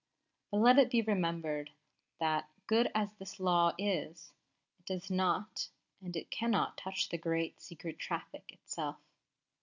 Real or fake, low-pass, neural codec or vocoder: real; 7.2 kHz; none